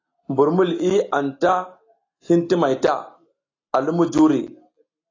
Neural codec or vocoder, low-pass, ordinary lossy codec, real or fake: none; 7.2 kHz; AAC, 32 kbps; real